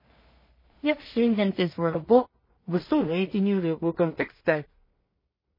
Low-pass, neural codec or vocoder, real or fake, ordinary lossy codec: 5.4 kHz; codec, 16 kHz in and 24 kHz out, 0.4 kbps, LongCat-Audio-Codec, two codebook decoder; fake; MP3, 24 kbps